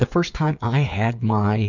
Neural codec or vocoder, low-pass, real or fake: codec, 16 kHz, 8 kbps, FreqCodec, smaller model; 7.2 kHz; fake